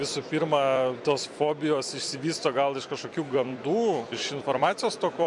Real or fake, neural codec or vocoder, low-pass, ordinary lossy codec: fake; vocoder, 44.1 kHz, 128 mel bands every 256 samples, BigVGAN v2; 10.8 kHz; MP3, 64 kbps